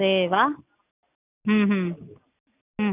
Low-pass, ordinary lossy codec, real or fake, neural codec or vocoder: 3.6 kHz; none; real; none